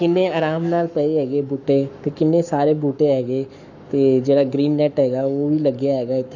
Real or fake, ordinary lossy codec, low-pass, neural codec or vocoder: fake; none; 7.2 kHz; codec, 44.1 kHz, 7.8 kbps, Pupu-Codec